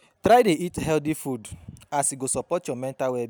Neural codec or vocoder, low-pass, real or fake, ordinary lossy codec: none; none; real; none